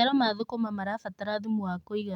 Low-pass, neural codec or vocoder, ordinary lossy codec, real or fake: 19.8 kHz; none; MP3, 96 kbps; real